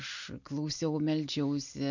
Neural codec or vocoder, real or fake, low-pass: none; real; 7.2 kHz